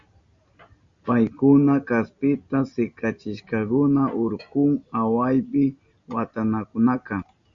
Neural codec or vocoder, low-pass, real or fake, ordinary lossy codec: none; 7.2 kHz; real; Opus, 64 kbps